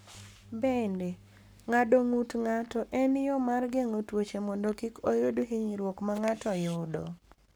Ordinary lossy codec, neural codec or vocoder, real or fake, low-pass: none; codec, 44.1 kHz, 7.8 kbps, Pupu-Codec; fake; none